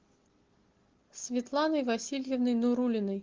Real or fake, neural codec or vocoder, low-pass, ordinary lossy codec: real; none; 7.2 kHz; Opus, 16 kbps